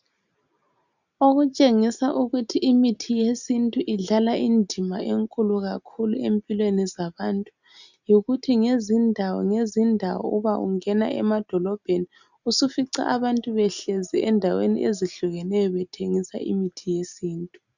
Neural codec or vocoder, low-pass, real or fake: none; 7.2 kHz; real